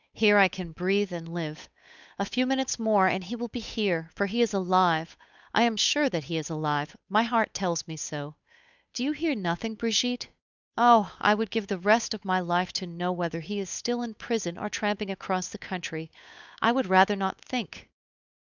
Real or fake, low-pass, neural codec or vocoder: fake; 7.2 kHz; codec, 16 kHz, 8 kbps, FunCodec, trained on Chinese and English, 25 frames a second